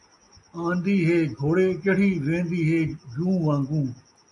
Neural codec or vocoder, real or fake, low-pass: none; real; 10.8 kHz